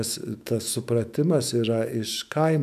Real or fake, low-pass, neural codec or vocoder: fake; 14.4 kHz; autoencoder, 48 kHz, 128 numbers a frame, DAC-VAE, trained on Japanese speech